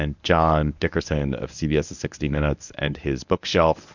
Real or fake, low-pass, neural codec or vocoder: fake; 7.2 kHz; codec, 24 kHz, 0.9 kbps, WavTokenizer, medium speech release version 1